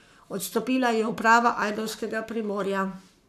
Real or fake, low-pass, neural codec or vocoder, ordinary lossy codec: fake; 14.4 kHz; codec, 44.1 kHz, 7.8 kbps, Pupu-Codec; none